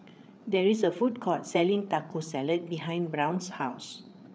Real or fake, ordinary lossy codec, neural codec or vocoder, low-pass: fake; none; codec, 16 kHz, 8 kbps, FreqCodec, larger model; none